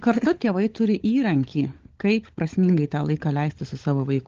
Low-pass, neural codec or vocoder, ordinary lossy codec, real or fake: 7.2 kHz; codec, 16 kHz, 16 kbps, FunCodec, trained on LibriTTS, 50 frames a second; Opus, 16 kbps; fake